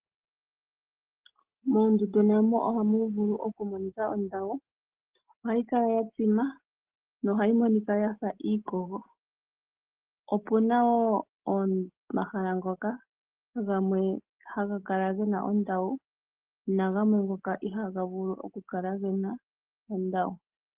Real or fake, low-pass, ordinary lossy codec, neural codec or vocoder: real; 3.6 kHz; Opus, 16 kbps; none